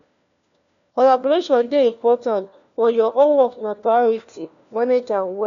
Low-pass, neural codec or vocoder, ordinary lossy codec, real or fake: 7.2 kHz; codec, 16 kHz, 1 kbps, FunCodec, trained on LibriTTS, 50 frames a second; MP3, 96 kbps; fake